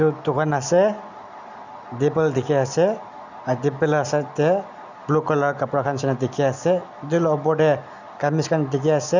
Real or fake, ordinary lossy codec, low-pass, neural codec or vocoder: real; none; 7.2 kHz; none